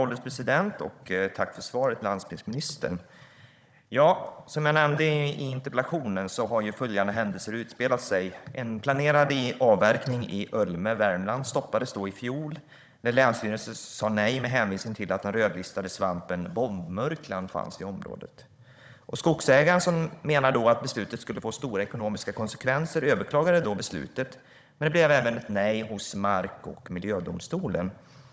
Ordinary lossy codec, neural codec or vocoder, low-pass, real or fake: none; codec, 16 kHz, 16 kbps, FunCodec, trained on Chinese and English, 50 frames a second; none; fake